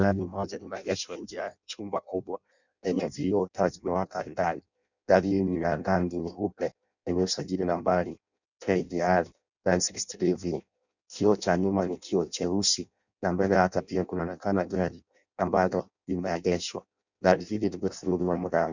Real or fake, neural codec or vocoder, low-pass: fake; codec, 16 kHz in and 24 kHz out, 0.6 kbps, FireRedTTS-2 codec; 7.2 kHz